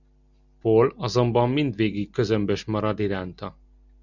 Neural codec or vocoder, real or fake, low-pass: none; real; 7.2 kHz